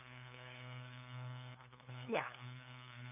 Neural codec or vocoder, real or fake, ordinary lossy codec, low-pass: codec, 16 kHz, 2 kbps, FunCodec, trained on LibriTTS, 25 frames a second; fake; none; 3.6 kHz